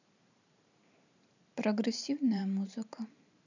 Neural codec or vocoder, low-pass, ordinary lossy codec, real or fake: vocoder, 44.1 kHz, 128 mel bands every 512 samples, BigVGAN v2; 7.2 kHz; none; fake